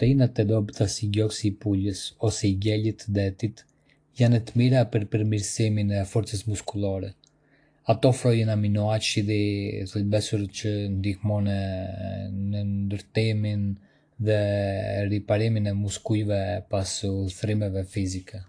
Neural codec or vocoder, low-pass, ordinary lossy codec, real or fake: none; 9.9 kHz; AAC, 48 kbps; real